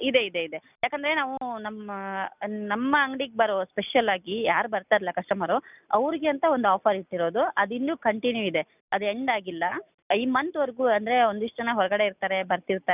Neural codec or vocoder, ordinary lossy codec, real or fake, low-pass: none; none; real; 3.6 kHz